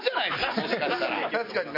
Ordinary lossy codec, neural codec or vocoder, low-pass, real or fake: MP3, 32 kbps; none; 5.4 kHz; real